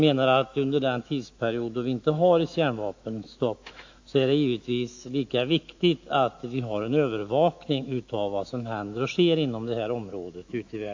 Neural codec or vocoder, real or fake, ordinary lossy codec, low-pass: autoencoder, 48 kHz, 128 numbers a frame, DAC-VAE, trained on Japanese speech; fake; AAC, 48 kbps; 7.2 kHz